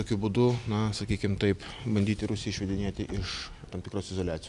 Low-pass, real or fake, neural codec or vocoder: 10.8 kHz; real; none